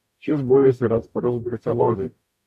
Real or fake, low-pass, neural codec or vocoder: fake; 14.4 kHz; codec, 44.1 kHz, 0.9 kbps, DAC